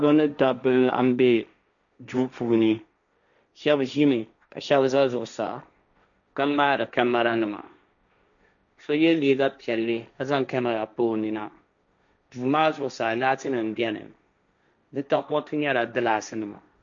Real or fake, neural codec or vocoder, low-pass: fake; codec, 16 kHz, 1.1 kbps, Voila-Tokenizer; 7.2 kHz